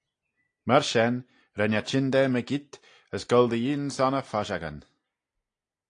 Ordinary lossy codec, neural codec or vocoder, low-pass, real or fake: AAC, 48 kbps; none; 9.9 kHz; real